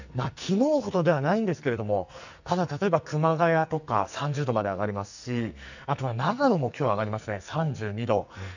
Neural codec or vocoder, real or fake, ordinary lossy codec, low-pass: codec, 44.1 kHz, 2.6 kbps, SNAC; fake; none; 7.2 kHz